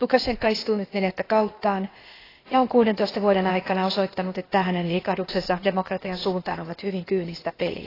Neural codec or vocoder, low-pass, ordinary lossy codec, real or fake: codec, 16 kHz, 0.8 kbps, ZipCodec; 5.4 kHz; AAC, 24 kbps; fake